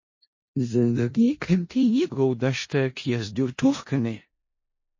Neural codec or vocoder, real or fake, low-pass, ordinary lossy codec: codec, 16 kHz in and 24 kHz out, 0.4 kbps, LongCat-Audio-Codec, four codebook decoder; fake; 7.2 kHz; MP3, 32 kbps